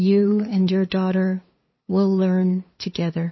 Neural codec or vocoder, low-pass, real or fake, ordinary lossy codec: codec, 16 kHz, 2 kbps, FunCodec, trained on LibriTTS, 25 frames a second; 7.2 kHz; fake; MP3, 24 kbps